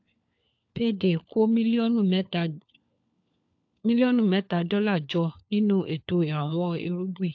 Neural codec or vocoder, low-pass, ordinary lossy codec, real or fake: codec, 16 kHz, 4 kbps, FunCodec, trained on LibriTTS, 50 frames a second; 7.2 kHz; AAC, 48 kbps; fake